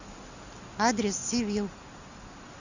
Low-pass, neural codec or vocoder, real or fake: 7.2 kHz; none; real